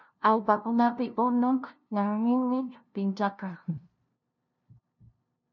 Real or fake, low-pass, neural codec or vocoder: fake; 7.2 kHz; codec, 16 kHz, 0.5 kbps, FunCodec, trained on LibriTTS, 25 frames a second